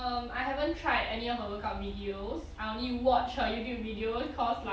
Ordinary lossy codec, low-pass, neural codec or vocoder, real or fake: none; none; none; real